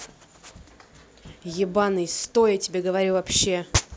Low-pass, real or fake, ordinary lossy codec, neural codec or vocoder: none; real; none; none